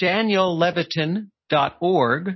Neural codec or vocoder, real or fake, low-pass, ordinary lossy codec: none; real; 7.2 kHz; MP3, 24 kbps